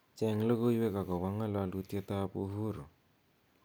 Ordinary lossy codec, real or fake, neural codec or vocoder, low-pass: none; real; none; none